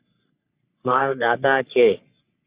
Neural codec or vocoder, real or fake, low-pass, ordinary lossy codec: codec, 44.1 kHz, 3.4 kbps, Pupu-Codec; fake; 3.6 kHz; Opus, 64 kbps